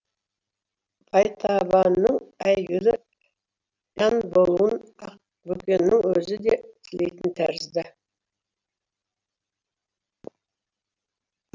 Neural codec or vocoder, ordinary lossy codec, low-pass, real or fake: none; none; 7.2 kHz; real